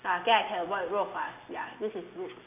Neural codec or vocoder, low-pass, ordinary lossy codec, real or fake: none; 3.6 kHz; none; real